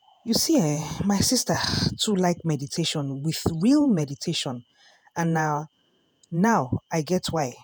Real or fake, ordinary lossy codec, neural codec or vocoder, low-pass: fake; none; vocoder, 48 kHz, 128 mel bands, Vocos; none